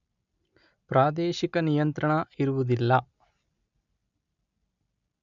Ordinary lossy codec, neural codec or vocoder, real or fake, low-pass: none; none; real; 7.2 kHz